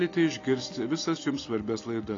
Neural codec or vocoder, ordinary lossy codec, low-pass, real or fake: none; AAC, 32 kbps; 7.2 kHz; real